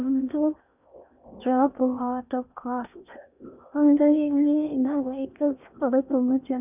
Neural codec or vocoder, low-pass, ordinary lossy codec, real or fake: codec, 16 kHz in and 24 kHz out, 0.8 kbps, FocalCodec, streaming, 65536 codes; 3.6 kHz; none; fake